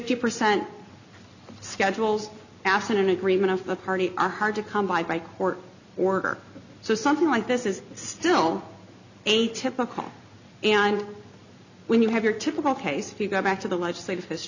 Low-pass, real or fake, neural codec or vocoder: 7.2 kHz; real; none